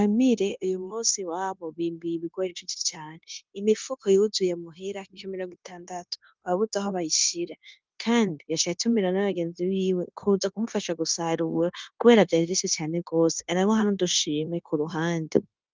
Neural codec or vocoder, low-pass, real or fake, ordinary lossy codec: codec, 16 kHz, 0.9 kbps, LongCat-Audio-Codec; 7.2 kHz; fake; Opus, 32 kbps